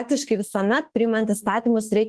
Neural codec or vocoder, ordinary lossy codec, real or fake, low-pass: autoencoder, 48 kHz, 32 numbers a frame, DAC-VAE, trained on Japanese speech; Opus, 16 kbps; fake; 10.8 kHz